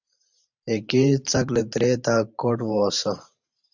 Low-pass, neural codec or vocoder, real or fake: 7.2 kHz; vocoder, 44.1 kHz, 128 mel bands every 512 samples, BigVGAN v2; fake